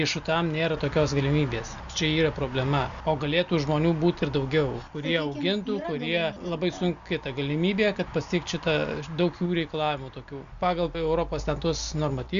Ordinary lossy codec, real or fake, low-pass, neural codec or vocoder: AAC, 96 kbps; real; 7.2 kHz; none